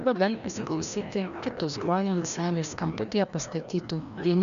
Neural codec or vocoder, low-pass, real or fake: codec, 16 kHz, 1 kbps, FreqCodec, larger model; 7.2 kHz; fake